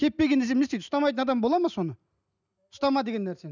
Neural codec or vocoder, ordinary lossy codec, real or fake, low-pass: none; none; real; 7.2 kHz